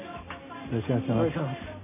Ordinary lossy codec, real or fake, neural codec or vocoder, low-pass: none; real; none; 3.6 kHz